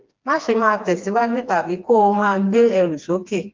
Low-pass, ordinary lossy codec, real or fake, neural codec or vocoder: 7.2 kHz; Opus, 24 kbps; fake; codec, 16 kHz, 2 kbps, FreqCodec, smaller model